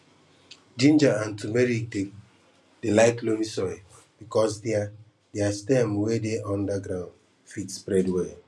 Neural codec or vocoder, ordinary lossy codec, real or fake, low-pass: none; none; real; none